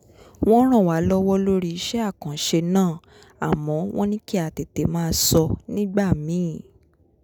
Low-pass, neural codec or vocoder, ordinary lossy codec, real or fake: none; none; none; real